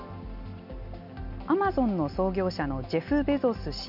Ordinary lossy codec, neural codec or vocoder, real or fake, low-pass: none; none; real; 5.4 kHz